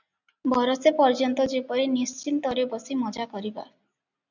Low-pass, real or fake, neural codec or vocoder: 7.2 kHz; real; none